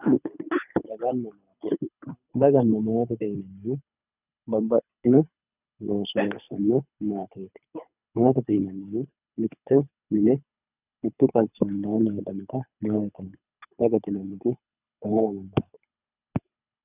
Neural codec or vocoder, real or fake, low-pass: codec, 24 kHz, 3 kbps, HILCodec; fake; 3.6 kHz